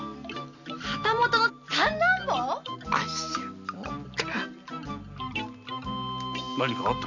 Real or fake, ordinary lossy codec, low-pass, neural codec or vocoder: real; none; 7.2 kHz; none